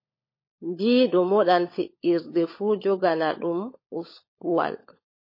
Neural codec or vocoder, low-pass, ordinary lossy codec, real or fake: codec, 16 kHz, 16 kbps, FunCodec, trained on LibriTTS, 50 frames a second; 5.4 kHz; MP3, 24 kbps; fake